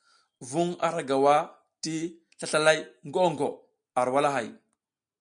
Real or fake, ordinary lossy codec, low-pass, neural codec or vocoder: real; MP3, 96 kbps; 9.9 kHz; none